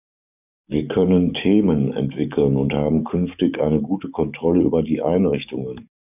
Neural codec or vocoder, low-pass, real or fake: codec, 44.1 kHz, 7.8 kbps, DAC; 3.6 kHz; fake